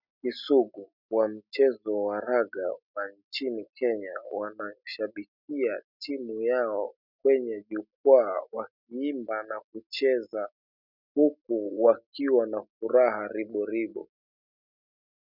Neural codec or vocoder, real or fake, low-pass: none; real; 5.4 kHz